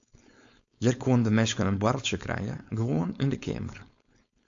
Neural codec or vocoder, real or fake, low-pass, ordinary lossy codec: codec, 16 kHz, 4.8 kbps, FACodec; fake; 7.2 kHz; AAC, 64 kbps